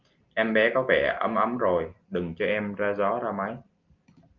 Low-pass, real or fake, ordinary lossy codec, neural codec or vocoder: 7.2 kHz; real; Opus, 24 kbps; none